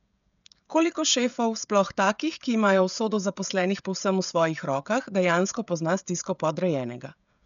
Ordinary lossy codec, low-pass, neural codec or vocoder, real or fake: MP3, 96 kbps; 7.2 kHz; codec, 16 kHz, 16 kbps, FreqCodec, smaller model; fake